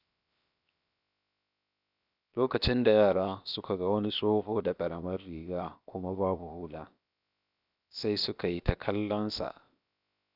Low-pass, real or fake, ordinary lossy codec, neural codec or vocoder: 5.4 kHz; fake; none; codec, 16 kHz, 0.7 kbps, FocalCodec